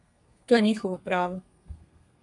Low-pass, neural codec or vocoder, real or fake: 10.8 kHz; codec, 32 kHz, 1.9 kbps, SNAC; fake